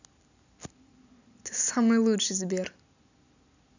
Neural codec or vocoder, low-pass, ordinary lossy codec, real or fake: none; 7.2 kHz; none; real